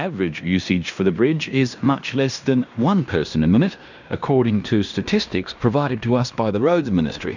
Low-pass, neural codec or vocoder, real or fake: 7.2 kHz; codec, 16 kHz in and 24 kHz out, 0.9 kbps, LongCat-Audio-Codec, fine tuned four codebook decoder; fake